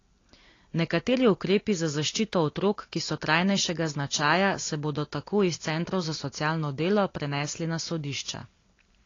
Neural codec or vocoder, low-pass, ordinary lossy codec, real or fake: none; 7.2 kHz; AAC, 32 kbps; real